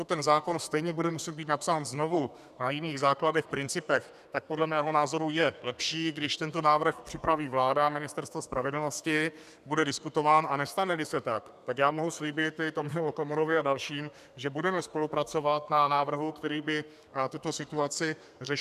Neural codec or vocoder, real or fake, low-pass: codec, 32 kHz, 1.9 kbps, SNAC; fake; 14.4 kHz